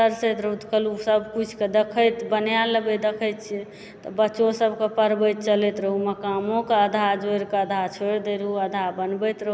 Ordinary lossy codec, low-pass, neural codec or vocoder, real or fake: none; none; none; real